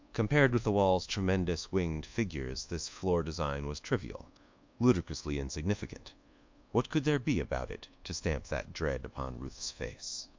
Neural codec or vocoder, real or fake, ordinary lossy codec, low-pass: codec, 24 kHz, 1.2 kbps, DualCodec; fake; MP3, 64 kbps; 7.2 kHz